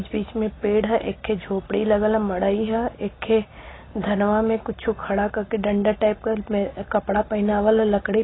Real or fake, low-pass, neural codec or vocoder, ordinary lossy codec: real; 7.2 kHz; none; AAC, 16 kbps